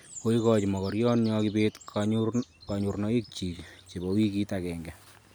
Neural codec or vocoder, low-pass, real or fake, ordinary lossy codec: none; none; real; none